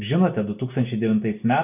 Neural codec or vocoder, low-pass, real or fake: none; 3.6 kHz; real